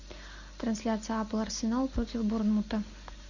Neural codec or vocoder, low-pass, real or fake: none; 7.2 kHz; real